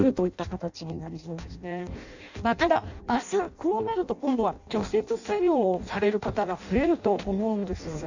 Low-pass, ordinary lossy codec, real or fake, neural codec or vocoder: 7.2 kHz; none; fake; codec, 16 kHz in and 24 kHz out, 0.6 kbps, FireRedTTS-2 codec